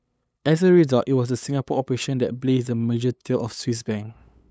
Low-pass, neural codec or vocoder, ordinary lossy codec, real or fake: none; codec, 16 kHz, 8 kbps, FunCodec, trained on LibriTTS, 25 frames a second; none; fake